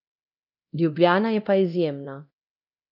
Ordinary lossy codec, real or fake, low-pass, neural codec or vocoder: none; fake; 5.4 kHz; codec, 24 kHz, 0.9 kbps, DualCodec